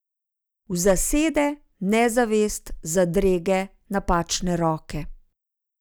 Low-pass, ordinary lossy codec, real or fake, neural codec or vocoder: none; none; real; none